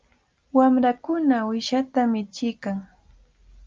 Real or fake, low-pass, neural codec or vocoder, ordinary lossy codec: real; 7.2 kHz; none; Opus, 24 kbps